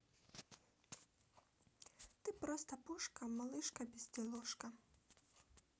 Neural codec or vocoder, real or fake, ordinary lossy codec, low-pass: none; real; none; none